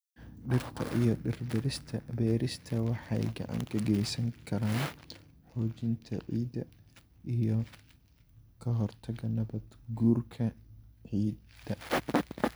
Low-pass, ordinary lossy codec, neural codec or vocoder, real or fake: none; none; none; real